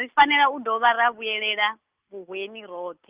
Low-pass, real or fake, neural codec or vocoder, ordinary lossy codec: 3.6 kHz; real; none; Opus, 24 kbps